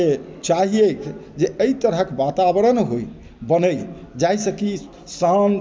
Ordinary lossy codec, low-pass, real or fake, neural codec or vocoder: none; none; real; none